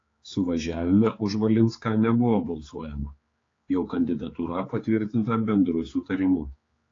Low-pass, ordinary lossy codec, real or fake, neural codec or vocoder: 7.2 kHz; AAC, 32 kbps; fake; codec, 16 kHz, 4 kbps, X-Codec, HuBERT features, trained on general audio